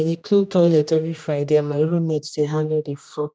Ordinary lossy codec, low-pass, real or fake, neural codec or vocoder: none; none; fake; codec, 16 kHz, 1 kbps, X-Codec, HuBERT features, trained on general audio